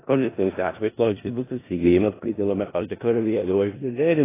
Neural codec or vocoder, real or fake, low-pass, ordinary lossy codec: codec, 16 kHz in and 24 kHz out, 0.4 kbps, LongCat-Audio-Codec, four codebook decoder; fake; 3.6 kHz; AAC, 16 kbps